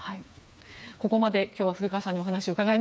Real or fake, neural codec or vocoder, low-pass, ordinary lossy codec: fake; codec, 16 kHz, 4 kbps, FreqCodec, smaller model; none; none